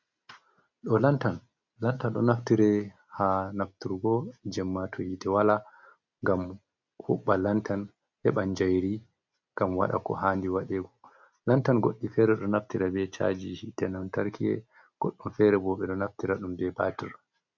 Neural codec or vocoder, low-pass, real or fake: none; 7.2 kHz; real